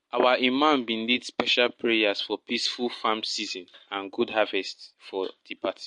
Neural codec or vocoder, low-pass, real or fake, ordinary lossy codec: none; 10.8 kHz; real; MP3, 48 kbps